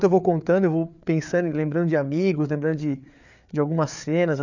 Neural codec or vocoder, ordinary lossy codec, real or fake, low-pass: codec, 16 kHz, 4 kbps, FreqCodec, larger model; none; fake; 7.2 kHz